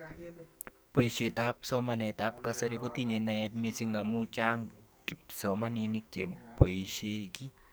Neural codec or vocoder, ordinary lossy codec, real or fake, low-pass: codec, 44.1 kHz, 2.6 kbps, SNAC; none; fake; none